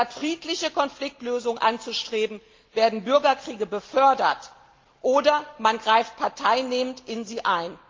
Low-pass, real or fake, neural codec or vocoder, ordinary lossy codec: 7.2 kHz; real; none; Opus, 32 kbps